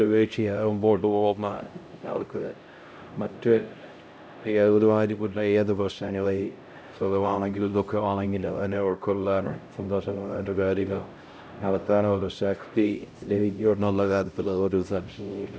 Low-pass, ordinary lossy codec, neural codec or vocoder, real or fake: none; none; codec, 16 kHz, 0.5 kbps, X-Codec, HuBERT features, trained on LibriSpeech; fake